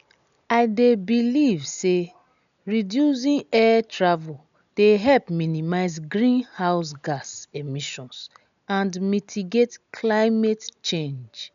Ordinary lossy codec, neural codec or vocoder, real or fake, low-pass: none; none; real; 7.2 kHz